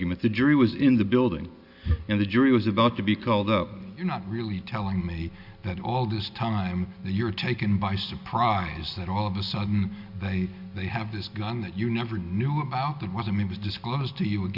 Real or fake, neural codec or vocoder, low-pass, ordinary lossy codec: real; none; 5.4 kHz; Opus, 64 kbps